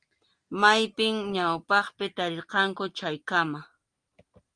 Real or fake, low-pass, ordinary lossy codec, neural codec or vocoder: real; 9.9 kHz; Opus, 32 kbps; none